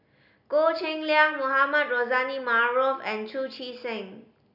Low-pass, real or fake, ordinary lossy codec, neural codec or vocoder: 5.4 kHz; real; none; none